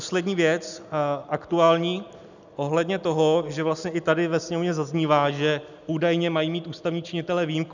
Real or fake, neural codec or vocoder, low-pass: real; none; 7.2 kHz